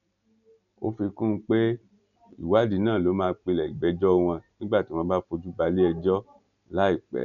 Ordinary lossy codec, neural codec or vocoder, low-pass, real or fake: none; none; 7.2 kHz; real